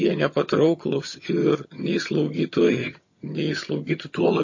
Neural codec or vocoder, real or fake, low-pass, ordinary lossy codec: vocoder, 22.05 kHz, 80 mel bands, HiFi-GAN; fake; 7.2 kHz; MP3, 32 kbps